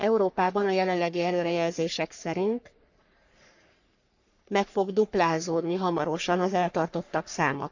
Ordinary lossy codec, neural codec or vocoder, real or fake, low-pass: none; codec, 44.1 kHz, 3.4 kbps, Pupu-Codec; fake; 7.2 kHz